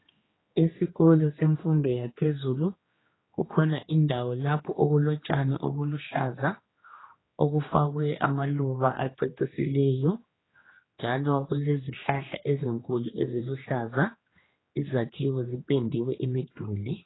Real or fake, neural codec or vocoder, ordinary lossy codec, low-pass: fake; codec, 16 kHz, 2 kbps, X-Codec, HuBERT features, trained on general audio; AAC, 16 kbps; 7.2 kHz